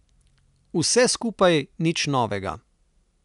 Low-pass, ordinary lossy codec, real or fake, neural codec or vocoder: 10.8 kHz; none; real; none